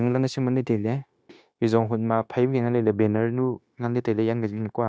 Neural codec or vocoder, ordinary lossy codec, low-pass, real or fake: codec, 16 kHz, 0.9 kbps, LongCat-Audio-Codec; none; none; fake